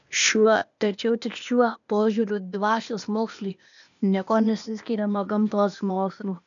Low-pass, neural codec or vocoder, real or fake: 7.2 kHz; codec, 16 kHz, 0.8 kbps, ZipCodec; fake